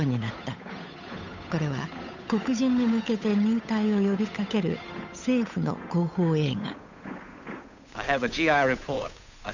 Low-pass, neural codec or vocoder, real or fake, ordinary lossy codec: 7.2 kHz; codec, 16 kHz, 8 kbps, FunCodec, trained on Chinese and English, 25 frames a second; fake; none